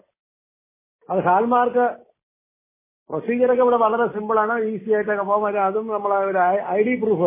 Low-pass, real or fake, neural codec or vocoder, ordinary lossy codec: 3.6 kHz; real; none; MP3, 16 kbps